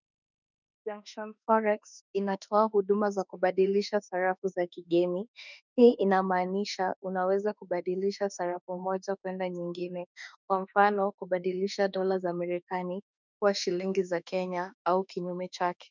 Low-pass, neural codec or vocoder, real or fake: 7.2 kHz; autoencoder, 48 kHz, 32 numbers a frame, DAC-VAE, trained on Japanese speech; fake